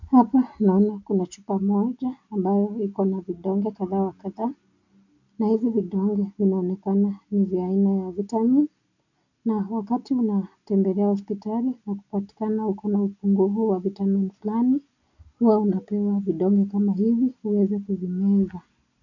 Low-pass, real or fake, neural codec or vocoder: 7.2 kHz; real; none